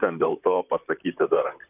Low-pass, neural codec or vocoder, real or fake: 3.6 kHz; vocoder, 44.1 kHz, 128 mel bands, Pupu-Vocoder; fake